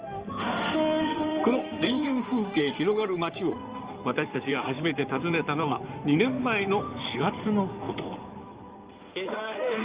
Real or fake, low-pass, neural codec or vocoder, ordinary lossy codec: fake; 3.6 kHz; codec, 16 kHz in and 24 kHz out, 2.2 kbps, FireRedTTS-2 codec; Opus, 24 kbps